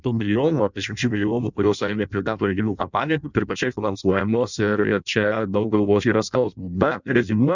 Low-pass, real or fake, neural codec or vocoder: 7.2 kHz; fake; codec, 16 kHz in and 24 kHz out, 0.6 kbps, FireRedTTS-2 codec